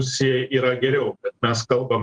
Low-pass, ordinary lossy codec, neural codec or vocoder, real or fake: 9.9 kHz; Opus, 24 kbps; vocoder, 44.1 kHz, 128 mel bands every 512 samples, BigVGAN v2; fake